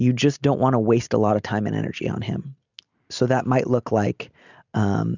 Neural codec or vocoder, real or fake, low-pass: none; real; 7.2 kHz